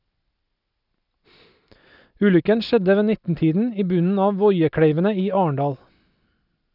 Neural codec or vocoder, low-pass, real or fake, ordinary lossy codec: none; 5.4 kHz; real; none